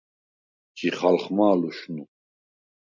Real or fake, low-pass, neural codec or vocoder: real; 7.2 kHz; none